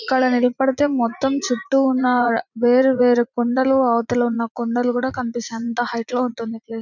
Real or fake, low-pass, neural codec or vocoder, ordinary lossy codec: fake; 7.2 kHz; vocoder, 44.1 kHz, 80 mel bands, Vocos; none